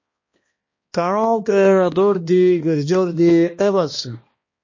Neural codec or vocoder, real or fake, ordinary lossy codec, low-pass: codec, 16 kHz, 1 kbps, X-Codec, HuBERT features, trained on balanced general audio; fake; MP3, 32 kbps; 7.2 kHz